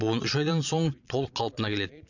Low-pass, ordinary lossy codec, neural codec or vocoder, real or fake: 7.2 kHz; none; vocoder, 22.05 kHz, 80 mel bands, Vocos; fake